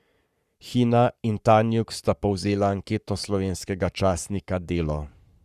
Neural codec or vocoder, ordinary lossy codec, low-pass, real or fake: codec, 44.1 kHz, 7.8 kbps, Pupu-Codec; Opus, 64 kbps; 14.4 kHz; fake